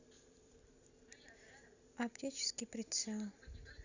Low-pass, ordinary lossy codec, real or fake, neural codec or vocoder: 7.2 kHz; Opus, 64 kbps; real; none